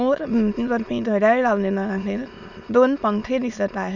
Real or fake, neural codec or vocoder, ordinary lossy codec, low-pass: fake; autoencoder, 22.05 kHz, a latent of 192 numbers a frame, VITS, trained on many speakers; none; 7.2 kHz